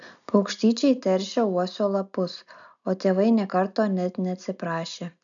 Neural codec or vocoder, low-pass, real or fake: none; 7.2 kHz; real